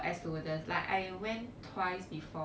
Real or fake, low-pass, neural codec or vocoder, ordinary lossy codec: real; none; none; none